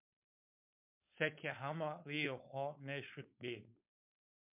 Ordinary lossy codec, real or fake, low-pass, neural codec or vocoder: MP3, 32 kbps; fake; 3.6 kHz; codec, 16 kHz, 4.8 kbps, FACodec